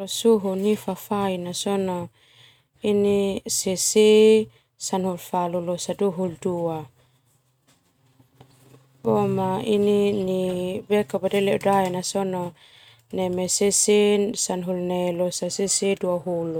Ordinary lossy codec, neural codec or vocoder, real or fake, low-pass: none; none; real; 19.8 kHz